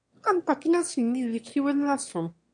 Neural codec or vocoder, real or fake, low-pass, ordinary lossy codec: autoencoder, 22.05 kHz, a latent of 192 numbers a frame, VITS, trained on one speaker; fake; 9.9 kHz; MP3, 64 kbps